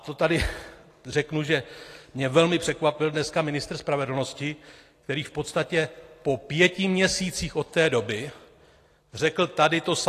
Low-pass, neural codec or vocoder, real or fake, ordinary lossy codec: 14.4 kHz; none; real; AAC, 48 kbps